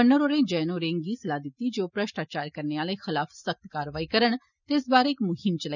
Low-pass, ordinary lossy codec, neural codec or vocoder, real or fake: 7.2 kHz; none; none; real